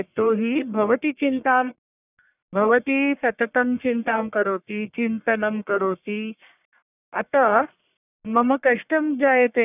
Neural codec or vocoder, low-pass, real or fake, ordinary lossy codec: codec, 44.1 kHz, 1.7 kbps, Pupu-Codec; 3.6 kHz; fake; none